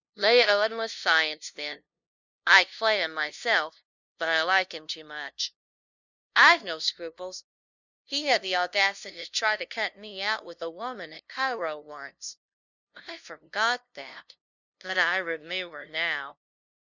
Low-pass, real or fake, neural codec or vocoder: 7.2 kHz; fake; codec, 16 kHz, 0.5 kbps, FunCodec, trained on LibriTTS, 25 frames a second